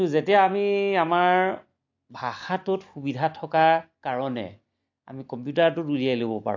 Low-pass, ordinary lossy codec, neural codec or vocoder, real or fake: 7.2 kHz; AAC, 48 kbps; none; real